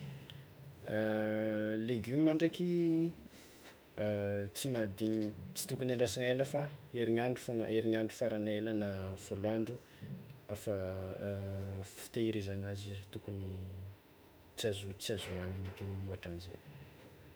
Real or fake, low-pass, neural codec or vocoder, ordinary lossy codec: fake; none; autoencoder, 48 kHz, 32 numbers a frame, DAC-VAE, trained on Japanese speech; none